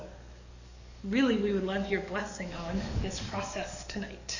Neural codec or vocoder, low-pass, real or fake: codec, 44.1 kHz, 7.8 kbps, DAC; 7.2 kHz; fake